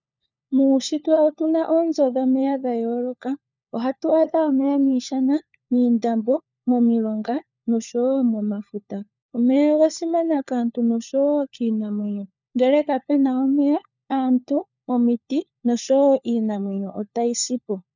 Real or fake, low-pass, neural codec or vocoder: fake; 7.2 kHz; codec, 16 kHz, 4 kbps, FunCodec, trained on LibriTTS, 50 frames a second